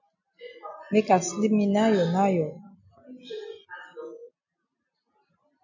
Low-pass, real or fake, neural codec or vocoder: 7.2 kHz; real; none